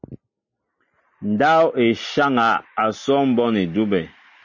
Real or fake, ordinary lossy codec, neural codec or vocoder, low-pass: real; MP3, 32 kbps; none; 7.2 kHz